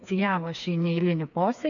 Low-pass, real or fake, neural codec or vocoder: 7.2 kHz; fake; codec, 16 kHz, 4 kbps, FreqCodec, smaller model